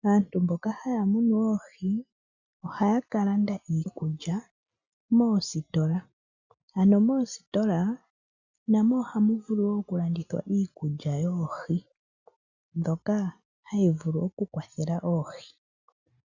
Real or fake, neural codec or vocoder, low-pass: real; none; 7.2 kHz